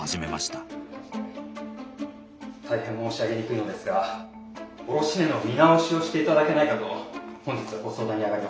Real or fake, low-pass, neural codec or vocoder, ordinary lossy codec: real; none; none; none